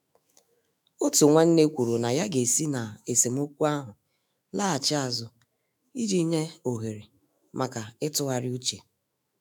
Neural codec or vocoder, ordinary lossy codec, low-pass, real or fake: autoencoder, 48 kHz, 128 numbers a frame, DAC-VAE, trained on Japanese speech; none; none; fake